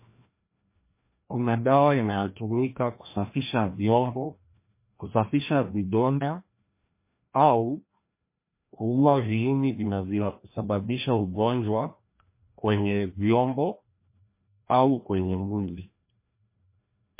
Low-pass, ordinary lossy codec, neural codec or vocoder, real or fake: 3.6 kHz; MP3, 24 kbps; codec, 16 kHz, 1 kbps, FreqCodec, larger model; fake